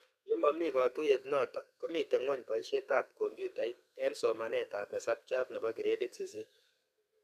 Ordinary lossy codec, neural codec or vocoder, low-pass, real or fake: none; codec, 32 kHz, 1.9 kbps, SNAC; 14.4 kHz; fake